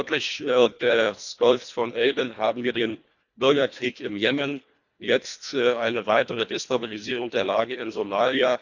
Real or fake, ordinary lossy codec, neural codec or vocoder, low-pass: fake; none; codec, 24 kHz, 1.5 kbps, HILCodec; 7.2 kHz